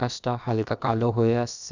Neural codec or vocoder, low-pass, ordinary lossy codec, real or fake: codec, 16 kHz, about 1 kbps, DyCAST, with the encoder's durations; 7.2 kHz; none; fake